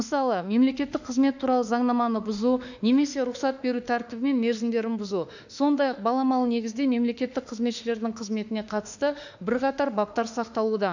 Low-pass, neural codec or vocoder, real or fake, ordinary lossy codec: 7.2 kHz; autoencoder, 48 kHz, 32 numbers a frame, DAC-VAE, trained on Japanese speech; fake; none